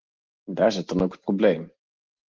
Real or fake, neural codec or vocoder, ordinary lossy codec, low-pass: real; none; Opus, 16 kbps; 7.2 kHz